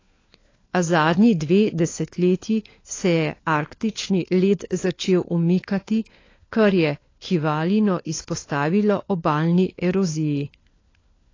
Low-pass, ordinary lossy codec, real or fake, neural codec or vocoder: 7.2 kHz; AAC, 32 kbps; fake; codec, 16 kHz, 4 kbps, FunCodec, trained on LibriTTS, 50 frames a second